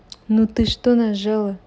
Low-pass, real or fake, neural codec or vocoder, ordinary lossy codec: none; real; none; none